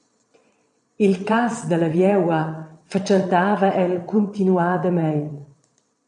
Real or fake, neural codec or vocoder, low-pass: fake; vocoder, 22.05 kHz, 80 mel bands, Vocos; 9.9 kHz